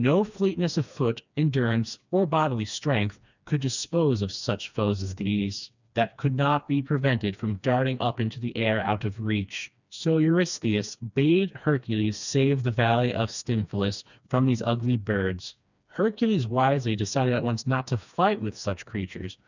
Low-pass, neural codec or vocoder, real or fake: 7.2 kHz; codec, 16 kHz, 2 kbps, FreqCodec, smaller model; fake